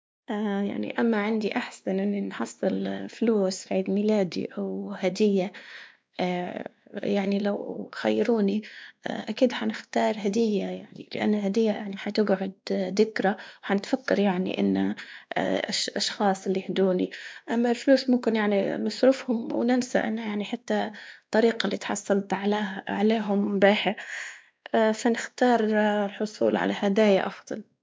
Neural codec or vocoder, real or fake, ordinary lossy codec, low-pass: codec, 16 kHz, 2 kbps, X-Codec, WavLM features, trained on Multilingual LibriSpeech; fake; none; none